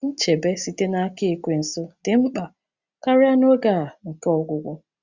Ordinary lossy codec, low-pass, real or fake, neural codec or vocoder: Opus, 64 kbps; 7.2 kHz; real; none